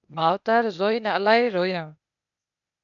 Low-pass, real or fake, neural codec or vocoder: 7.2 kHz; fake; codec, 16 kHz, 0.8 kbps, ZipCodec